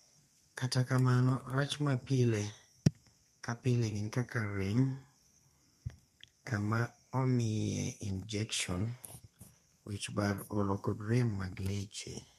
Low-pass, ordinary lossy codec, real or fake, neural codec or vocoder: 14.4 kHz; MP3, 64 kbps; fake; codec, 32 kHz, 1.9 kbps, SNAC